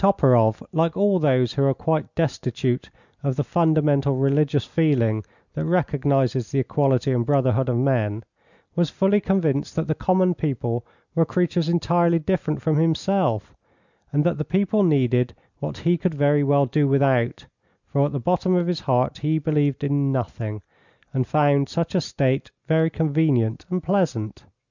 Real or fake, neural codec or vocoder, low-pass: real; none; 7.2 kHz